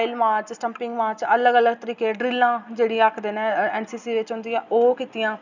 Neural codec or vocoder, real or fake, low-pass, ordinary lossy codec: none; real; 7.2 kHz; none